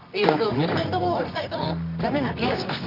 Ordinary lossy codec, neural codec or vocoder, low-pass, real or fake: none; codec, 24 kHz, 0.9 kbps, WavTokenizer, medium music audio release; 5.4 kHz; fake